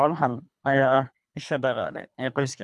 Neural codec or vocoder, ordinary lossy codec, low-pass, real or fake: codec, 24 kHz, 3 kbps, HILCodec; none; none; fake